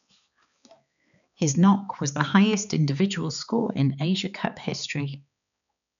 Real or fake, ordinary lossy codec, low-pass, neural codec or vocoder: fake; none; 7.2 kHz; codec, 16 kHz, 4 kbps, X-Codec, HuBERT features, trained on balanced general audio